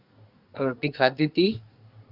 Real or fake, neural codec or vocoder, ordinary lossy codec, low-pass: fake; codec, 24 kHz, 1 kbps, SNAC; Opus, 64 kbps; 5.4 kHz